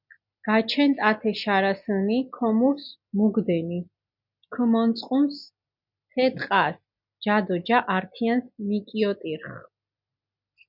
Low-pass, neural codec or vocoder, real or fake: 5.4 kHz; none; real